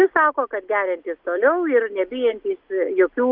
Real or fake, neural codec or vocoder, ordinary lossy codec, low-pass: real; none; Opus, 32 kbps; 5.4 kHz